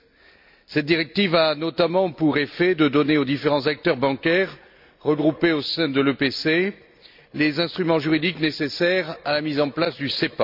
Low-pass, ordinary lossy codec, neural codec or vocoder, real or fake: 5.4 kHz; MP3, 48 kbps; none; real